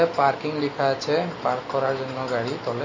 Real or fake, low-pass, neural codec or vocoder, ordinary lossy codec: real; 7.2 kHz; none; MP3, 32 kbps